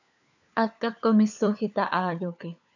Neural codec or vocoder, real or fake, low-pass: codec, 16 kHz, 4 kbps, FunCodec, trained on LibriTTS, 50 frames a second; fake; 7.2 kHz